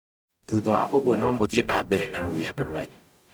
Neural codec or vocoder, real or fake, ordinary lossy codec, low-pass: codec, 44.1 kHz, 0.9 kbps, DAC; fake; none; none